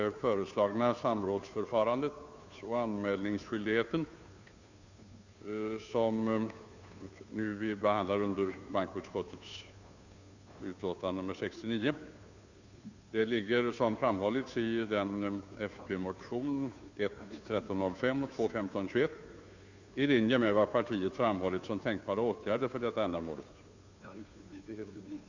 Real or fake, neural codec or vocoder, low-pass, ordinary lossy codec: fake; codec, 16 kHz, 2 kbps, FunCodec, trained on Chinese and English, 25 frames a second; 7.2 kHz; Opus, 64 kbps